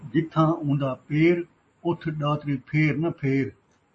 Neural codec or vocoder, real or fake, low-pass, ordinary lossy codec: none; real; 9.9 kHz; MP3, 32 kbps